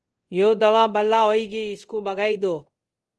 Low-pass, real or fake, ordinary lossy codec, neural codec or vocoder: 10.8 kHz; fake; Opus, 24 kbps; codec, 24 kHz, 0.5 kbps, DualCodec